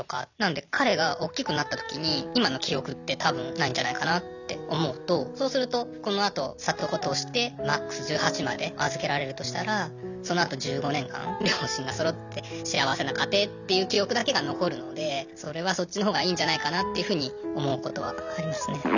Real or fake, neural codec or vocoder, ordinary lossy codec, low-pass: real; none; none; 7.2 kHz